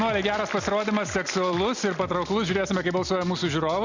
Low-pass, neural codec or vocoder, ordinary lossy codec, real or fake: 7.2 kHz; none; Opus, 64 kbps; real